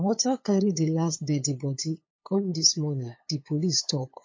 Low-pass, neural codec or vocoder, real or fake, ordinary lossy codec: 7.2 kHz; codec, 16 kHz, 8 kbps, FunCodec, trained on LibriTTS, 25 frames a second; fake; MP3, 32 kbps